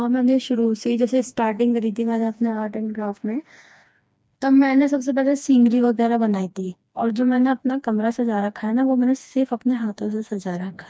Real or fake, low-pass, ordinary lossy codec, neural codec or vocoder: fake; none; none; codec, 16 kHz, 2 kbps, FreqCodec, smaller model